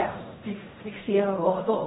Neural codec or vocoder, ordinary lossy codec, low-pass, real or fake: codec, 16 kHz in and 24 kHz out, 0.4 kbps, LongCat-Audio-Codec, fine tuned four codebook decoder; AAC, 16 kbps; 10.8 kHz; fake